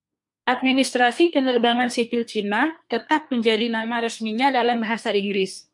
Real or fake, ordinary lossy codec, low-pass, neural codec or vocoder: fake; MP3, 64 kbps; 10.8 kHz; codec, 24 kHz, 1 kbps, SNAC